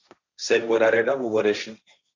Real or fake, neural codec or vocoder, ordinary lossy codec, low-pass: fake; codec, 16 kHz, 1.1 kbps, Voila-Tokenizer; Opus, 64 kbps; 7.2 kHz